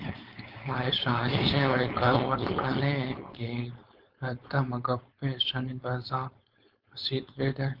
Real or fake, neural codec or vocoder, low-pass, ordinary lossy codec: fake; codec, 16 kHz, 4.8 kbps, FACodec; 5.4 kHz; Opus, 32 kbps